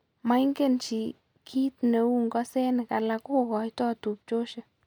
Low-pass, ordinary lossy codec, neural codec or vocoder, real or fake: 19.8 kHz; none; none; real